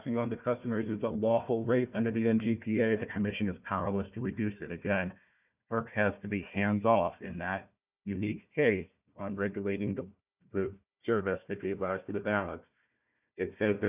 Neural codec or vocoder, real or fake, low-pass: codec, 16 kHz, 1 kbps, FunCodec, trained on Chinese and English, 50 frames a second; fake; 3.6 kHz